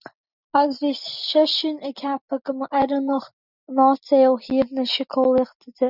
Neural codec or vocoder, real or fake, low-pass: none; real; 5.4 kHz